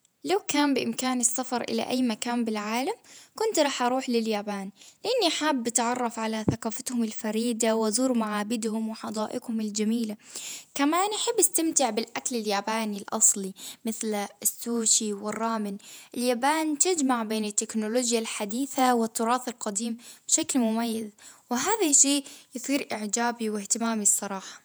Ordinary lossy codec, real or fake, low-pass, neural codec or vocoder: none; fake; none; vocoder, 48 kHz, 128 mel bands, Vocos